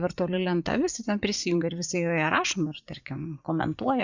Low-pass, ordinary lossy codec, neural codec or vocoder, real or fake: 7.2 kHz; Opus, 64 kbps; vocoder, 44.1 kHz, 80 mel bands, Vocos; fake